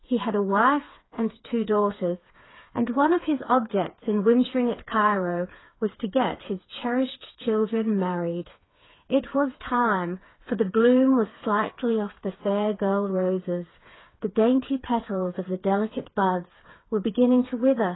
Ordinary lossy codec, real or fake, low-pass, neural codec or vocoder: AAC, 16 kbps; fake; 7.2 kHz; codec, 16 kHz, 4 kbps, FreqCodec, smaller model